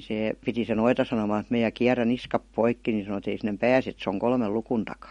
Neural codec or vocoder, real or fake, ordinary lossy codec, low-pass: none; real; MP3, 48 kbps; 19.8 kHz